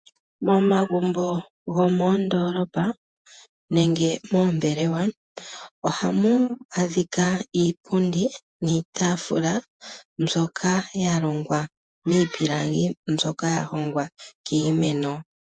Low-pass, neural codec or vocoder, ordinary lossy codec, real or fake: 9.9 kHz; vocoder, 48 kHz, 128 mel bands, Vocos; MP3, 64 kbps; fake